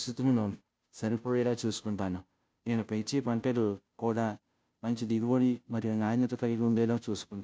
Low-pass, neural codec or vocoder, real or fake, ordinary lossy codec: none; codec, 16 kHz, 0.5 kbps, FunCodec, trained on Chinese and English, 25 frames a second; fake; none